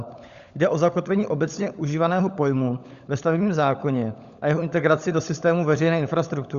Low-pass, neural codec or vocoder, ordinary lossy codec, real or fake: 7.2 kHz; codec, 16 kHz, 16 kbps, FunCodec, trained on LibriTTS, 50 frames a second; Opus, 64 kbps; fake